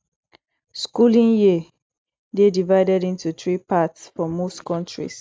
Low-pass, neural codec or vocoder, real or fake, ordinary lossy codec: none; none; real; none